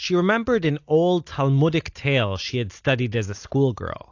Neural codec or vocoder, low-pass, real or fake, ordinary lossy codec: none; 7.2 kHz; real; AAC, 48 kbps